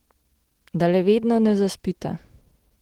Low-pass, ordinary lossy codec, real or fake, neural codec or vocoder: 19.8 kHz; Opus, 16 kbps; fake; autoencoder, 48 kHz, 128 numbers a frame, DAC-VAE, trained on Japanese speech